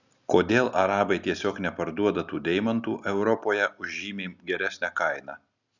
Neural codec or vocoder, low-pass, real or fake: none; 7.2 kHz; real